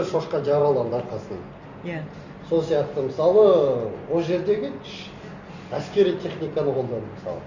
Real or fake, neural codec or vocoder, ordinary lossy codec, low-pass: real; none; none; 7.2 kHz